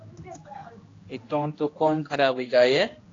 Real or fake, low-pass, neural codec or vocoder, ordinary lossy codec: fake; 7.2 kHz; codec, 16 kHz, 1 kbps, X-Codec, HuBERT features, trained on general audio; AAC, 32 kbps